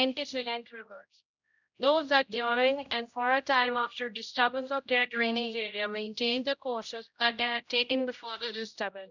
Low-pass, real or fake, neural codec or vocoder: 7.2 kHz; fake; codec, 16 kHz, 0.5 kbps, X-Codec, HuBERT features, trained on general audio